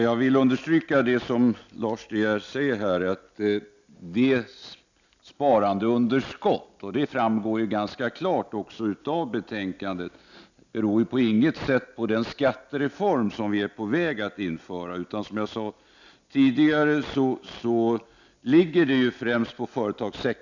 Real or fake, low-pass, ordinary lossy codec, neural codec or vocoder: real; 7.2 kHz; none; none